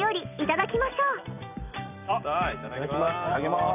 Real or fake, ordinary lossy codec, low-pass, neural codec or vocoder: fake; none; 3.6 kHz; vocoder, 44.1 kHz, 128 mel bands every 256 samples, BigVGAN v2